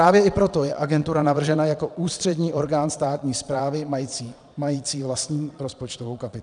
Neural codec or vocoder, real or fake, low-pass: vocoder, 22.05 kHz, 80 mel bands, Vocos; fake; 9.9 kHz